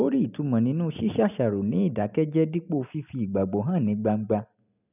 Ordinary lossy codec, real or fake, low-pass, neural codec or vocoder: none; real; 3.6 kHz; none